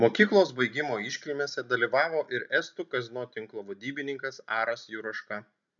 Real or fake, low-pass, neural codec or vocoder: real; 7.2 kHz; none